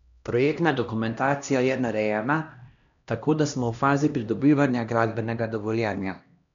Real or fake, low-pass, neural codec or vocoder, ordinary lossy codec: fake; 7.2 kHz; codec, 16 kHz, 1 kbps, X-Codec, HuBERT features, trained on LibriSpeech; none